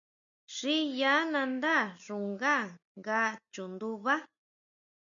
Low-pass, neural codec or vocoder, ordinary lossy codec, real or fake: 7.2 kHz; none; MP3, 96 kbps; real